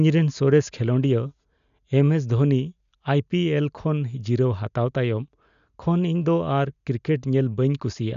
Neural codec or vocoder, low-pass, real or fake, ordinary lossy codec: none; 7.2 kHz; real; MP3, 96 kbps